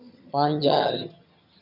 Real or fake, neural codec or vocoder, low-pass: fake; vocoder, 22.05 kHz, 80 mel bands, HiFi-GAN; 5.4 kHz